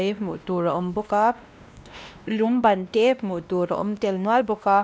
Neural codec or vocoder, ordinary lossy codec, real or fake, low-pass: codec, 16 kHz, 1 kbps, X-Codec, WavLM features, trained on Multilingual LibriSpeech; none; fake; none